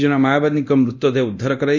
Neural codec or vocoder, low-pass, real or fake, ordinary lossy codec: codec, 24 kHz, 0.9 kbps, DualCodec; 7.2 kHz; fake; none